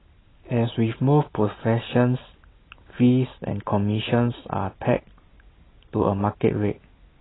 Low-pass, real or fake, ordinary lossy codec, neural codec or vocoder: 7.2 kHz; real; AAC, 16 kbps; none